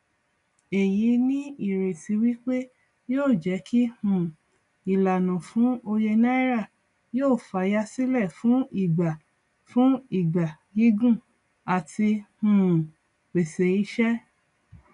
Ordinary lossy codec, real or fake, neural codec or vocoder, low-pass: none; real; none; 10.8 kHz